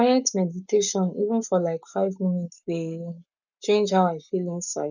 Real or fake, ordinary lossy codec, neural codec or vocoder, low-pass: fake; none; codec, 44.1 kHz, 7.8 kbps, Pupu-Codec; 7.2 kHz